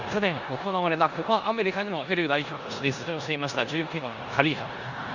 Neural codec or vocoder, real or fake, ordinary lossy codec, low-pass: codec, 16 kHz in and 24 kHz out, 0.9 kbps, LongCat-Audio-Codec, four codebook decoder; fake; Opus, 64 kbps; 7.2 kHz